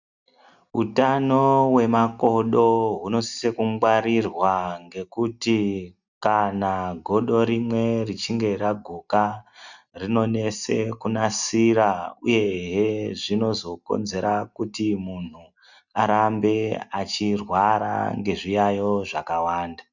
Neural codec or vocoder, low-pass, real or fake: none; 7.2 kHz; real